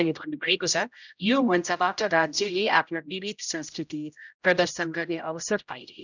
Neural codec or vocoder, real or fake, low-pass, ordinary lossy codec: codec, 16 kHz, 0.5 kbps, X-Codec, HuBERT features, trained on general audio; fake; 7.2 kHz; none